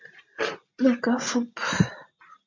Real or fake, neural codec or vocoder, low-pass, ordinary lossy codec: real; none; 7.2 kHz; MP3, 48 kbps